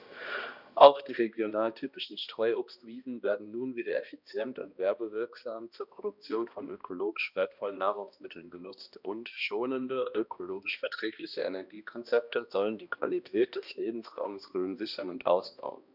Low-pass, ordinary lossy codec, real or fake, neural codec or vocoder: 5.4 kHz; none; fake; codec, 16 kHz, 1 kbps, X-Codec, HuBERT features, trained on balanced general audio